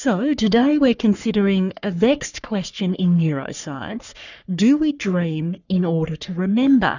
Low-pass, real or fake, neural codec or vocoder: 7.2 kHz; fake; codec, 44.1 kHz, 3.4 kbps, Pupu-Codec